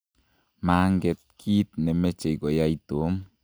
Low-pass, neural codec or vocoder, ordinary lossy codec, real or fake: none; none; none; real